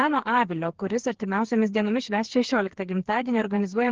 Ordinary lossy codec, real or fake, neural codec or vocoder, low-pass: Opus, 16 kbps; fake; codec, 16 kHz, 4 kbps, FreqCodec, smaller model; 7.2 kHz